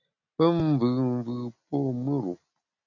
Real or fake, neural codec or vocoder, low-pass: real; none; 7.2 kHz